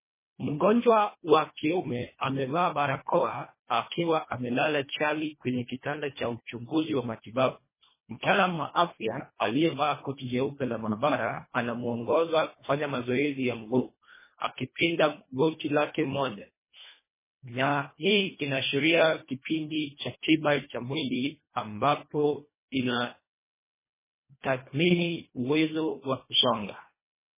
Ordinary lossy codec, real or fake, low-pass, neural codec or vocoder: MP3, 16 kbps; fake; 3.6 kHz; codec, 24 kHz, 1.5 kbps, HILCodec